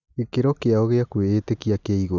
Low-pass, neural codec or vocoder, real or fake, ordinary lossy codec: 7.2 kHz; none; real; none